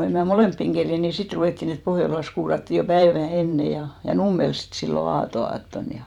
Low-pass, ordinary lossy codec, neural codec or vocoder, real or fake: 19.8 kHz; none; vocoder, 44.1 kHz, 128 mel bands every 512 samples, BigVGAN v2; fake